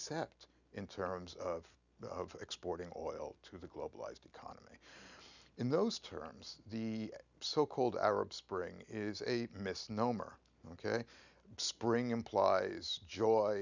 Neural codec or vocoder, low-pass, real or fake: none; 7.2 kHz; real